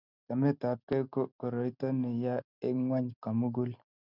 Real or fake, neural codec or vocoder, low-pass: real; none; 5.4 kHz